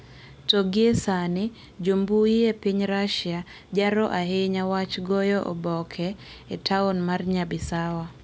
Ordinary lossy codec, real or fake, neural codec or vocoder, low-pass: none; real; none; none